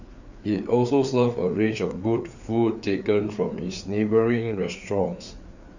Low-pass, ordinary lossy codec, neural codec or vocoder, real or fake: 7.2 kHz; none; codec, 16 kHz, 4 kbps, FreqCodec, larger model; fake